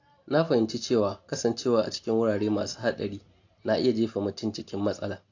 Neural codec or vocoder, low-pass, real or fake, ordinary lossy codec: none; 7.2 kHz; real; AAC, 48 kbps